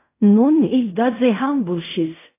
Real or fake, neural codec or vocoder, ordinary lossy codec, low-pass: fake; codec, 16 kHz in and 24 kHz out, 0.4 kbps, LongCat-Audio-Codec, fine tuned four codebook decoder; AAC, 24 kbps; 3.6 kHz